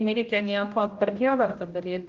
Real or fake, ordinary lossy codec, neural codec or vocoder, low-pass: fake; Opus, 32 kbps; codec, 16 kHz, 0.5 kbps, X-Codec, HuBERT features, trained on general audio; 7.2 kHz